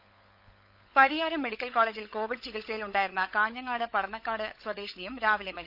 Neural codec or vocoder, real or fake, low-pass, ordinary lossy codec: codec, 16 kHz, 16 kbps, FunCodec, trained on LibriTTS, 50 frames a second; fake; 5.4 kHz; none